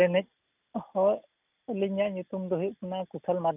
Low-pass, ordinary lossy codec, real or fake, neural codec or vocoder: 3.6 kHz; none; real; none